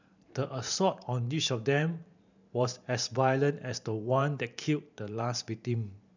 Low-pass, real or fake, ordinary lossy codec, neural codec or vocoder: 7.2 kHz; fake; none; vocoder, 44.1 kHz, 128 mel bands every 512 samples, BigVGAN v2